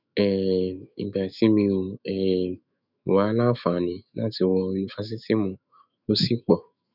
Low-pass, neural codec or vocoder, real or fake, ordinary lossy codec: 5.4 kHz; autoencoder, 48 kHz, 128 numbers a frame, DAC-VAE, trained on Japanese speech; fake; none